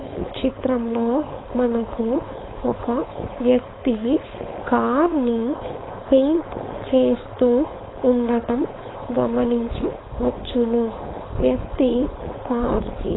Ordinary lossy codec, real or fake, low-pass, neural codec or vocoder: AAC, 16 kbps; fake; 7.2 kHz; codec, 16 kHz, 4.8 kbps, FACodec